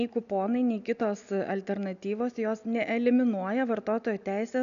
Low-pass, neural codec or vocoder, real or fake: 7.2 kHz; none; real